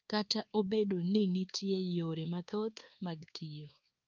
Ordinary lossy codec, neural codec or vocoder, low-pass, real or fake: Opus, 24 kbps; codec, 24 kHz, 1.2 kbps, DualCodec; 7.2 kHz; fake